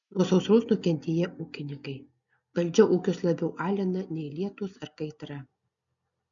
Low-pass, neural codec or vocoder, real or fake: 7.2 kHz; none; real